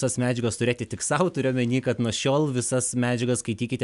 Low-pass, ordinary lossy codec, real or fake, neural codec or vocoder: 10.8 kHz; MP3, 96 kbps; real; none